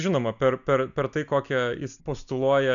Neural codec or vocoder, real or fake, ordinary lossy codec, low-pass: none; real; MP3, 96 kbps; 7.2 kHz